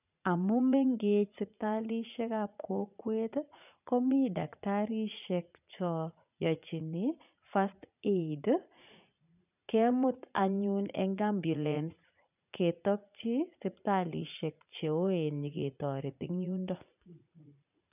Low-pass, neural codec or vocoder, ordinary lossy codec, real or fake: 3.6 kHz; vocoder, 44.1 kHz, 80 mel bands, Vocos; none; fake